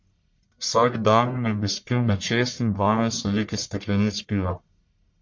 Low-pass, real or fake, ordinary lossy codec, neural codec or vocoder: 7.2 kHz; fake; MP3, 48 kbps; codec, 44.1 kHz, 1.7 kbps, Pupu-Codec